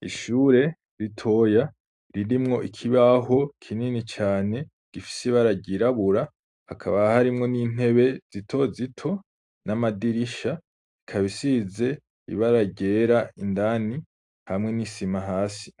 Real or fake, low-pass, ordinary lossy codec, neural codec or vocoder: real; 10.8 kHz; AAC, 64 kbps; none